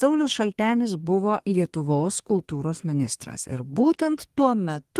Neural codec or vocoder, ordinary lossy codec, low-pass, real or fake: codec, 32 kHz, 1.9 kbps, SNAC; Opus, 24 kbps; 14.4 kHz; fake